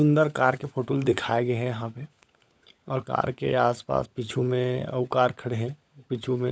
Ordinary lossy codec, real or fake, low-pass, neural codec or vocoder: none; fake; none; codec, 16 kHz, 16 kbps, FunCodec, trained on LibriTTS, 50 frames a second